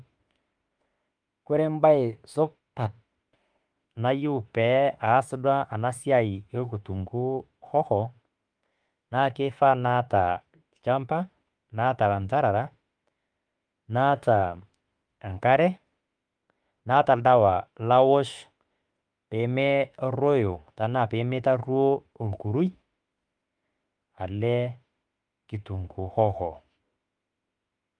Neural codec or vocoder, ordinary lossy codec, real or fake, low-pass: autoencoder, 48 kHz, 32 numbers a frame, DAC-VAE, trained on Japanese speech; Opus, 32 kbps; fake; 9.9 kHz